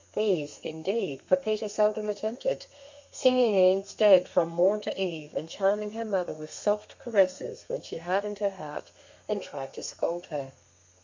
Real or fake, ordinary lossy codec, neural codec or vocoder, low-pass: fake; MP3, 48 kbps; codec, 32 kHz, 1.9 kbps, SNAC; 7.2 kHz